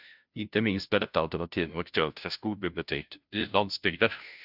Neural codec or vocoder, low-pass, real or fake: codec, 16 kHz, 0.5 kbps, FunCodec, trained on Chinese and English, 25 frames a second; 5.4 kHz; fake